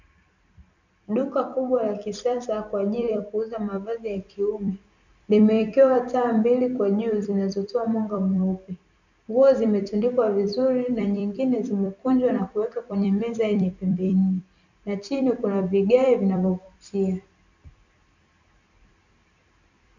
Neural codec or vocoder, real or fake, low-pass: vocoder, 44.1 kHz, 128 mel bands every 256 samples, BigVGAN v2; fake; 7.2 kHz